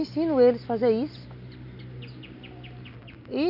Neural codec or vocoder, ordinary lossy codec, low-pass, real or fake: none; none; 5.4 kHz; real